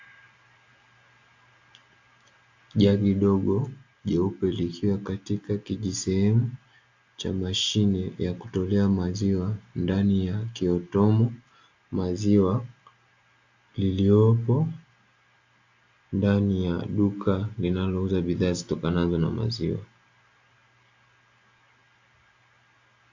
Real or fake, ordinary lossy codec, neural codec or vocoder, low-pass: real; AAC, 48 kbps; none; 7.2 kHz